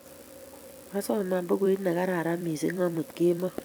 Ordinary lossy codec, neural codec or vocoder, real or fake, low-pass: none; vocoder, 44.1 kHz, 128 mel bands every 256 samples, BigVGAN v2; fake; none